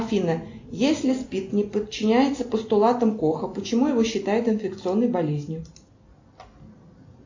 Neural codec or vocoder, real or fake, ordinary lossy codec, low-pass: none; real; AAC, 48 kbps; 7.2 kHz